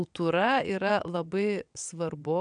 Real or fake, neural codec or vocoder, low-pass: fake; vocoder, 22.05 kHz, 80 mel bands, WaveNeXt; 9.9 kHz